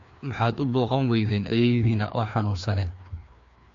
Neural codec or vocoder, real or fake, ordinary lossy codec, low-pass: codec, 16 kHz, 2 kbps, FreqCodec, larger model; fake; MP3, 48 kbps; 7.2 kHz